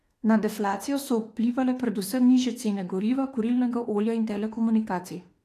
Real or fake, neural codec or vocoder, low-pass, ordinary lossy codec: fake; autoencoder, 48 kHz, 32 numbers a frame, DAC-VAE, trained on Japanese speech; 14.4 kHz; AAC, 48 kbps